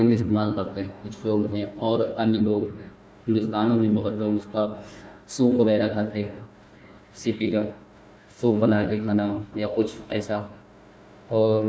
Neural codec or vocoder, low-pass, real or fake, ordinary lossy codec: codec, 16 kHz, 1 kbps, FunCodec, trained on Chinese and English, 50 frames a second; none; fake; none